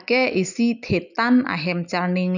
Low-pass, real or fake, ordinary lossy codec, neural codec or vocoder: 7.2 kHz; real; none; none